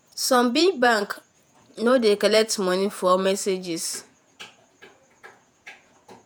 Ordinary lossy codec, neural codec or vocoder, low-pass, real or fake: none; vocoder, 48 kHz, 128 mel bands, Vocos; none; fake